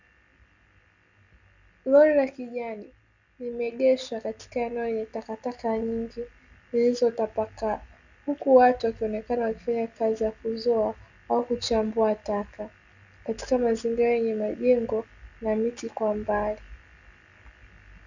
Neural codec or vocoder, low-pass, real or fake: none; 7.2 kHz; real